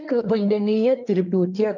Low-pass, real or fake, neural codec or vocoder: 7.2 kHz; fake; codec, 24 kHz, 1 kbps, SNAC